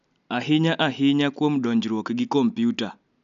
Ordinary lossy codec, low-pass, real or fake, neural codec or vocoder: none; 7.2 kHz; real; none